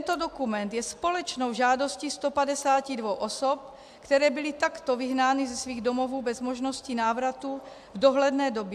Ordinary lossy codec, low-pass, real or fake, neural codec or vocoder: AAC, 96 kbps; 14.4 kHz; real; none